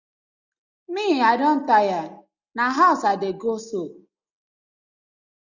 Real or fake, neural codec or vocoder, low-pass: real; none; 7.2 kHz